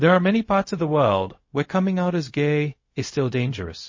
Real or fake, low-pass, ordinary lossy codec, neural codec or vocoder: fake; 7.2 kHz; MP3, 32 kbps; codec, 16 kHz, 0.4 kbps, LongCat-Audio-Codec